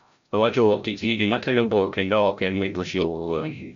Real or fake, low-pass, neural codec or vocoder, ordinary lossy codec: fake; 7.2 kHz; codec, 16 kHz, 0.5 kbps, FreqCodec, larger model; MP3, 64 kbps